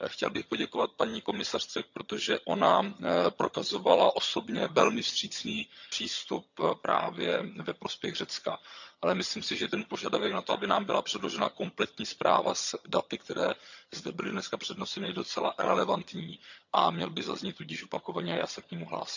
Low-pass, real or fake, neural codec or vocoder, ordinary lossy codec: 7.2 kHz; fake; vocoder, 22.05 kHz, 80 mel bands, HiFi-GAN; none